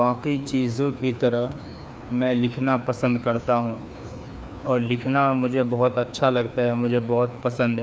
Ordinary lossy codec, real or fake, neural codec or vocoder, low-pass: none; fake; codec, 16 kHz, 2 kbps, FreqCodec, larger model; none